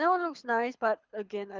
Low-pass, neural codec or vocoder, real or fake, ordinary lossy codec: 7.2 kHz; codec, 24 kHz, 6 kbps, HILCodec; fake; Opus, 32 kbps